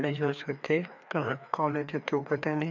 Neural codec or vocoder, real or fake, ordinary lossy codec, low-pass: codec, 16 kHz, 2 kbps, FreqCodec, larger model; fake; none; 7.2 kHz